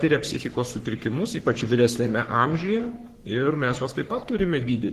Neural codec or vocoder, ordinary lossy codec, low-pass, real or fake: codec, 44.1 kHz, 3.4 kbps, Pupu-Codec; Opus, 16 kbps; 14.4 kHz; fake